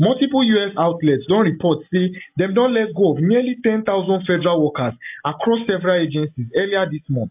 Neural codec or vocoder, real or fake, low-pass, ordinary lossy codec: none; real; 3.6 kHz; AAC, 32 kbps